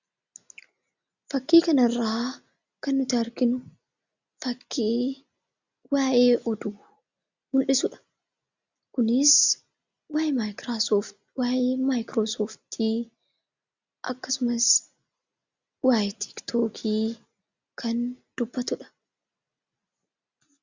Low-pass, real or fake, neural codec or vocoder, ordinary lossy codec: 7.2 kHz; real; none; Opus, 64 kbps